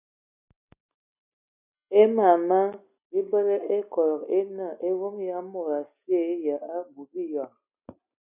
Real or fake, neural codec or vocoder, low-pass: real; none; 3.6 kHz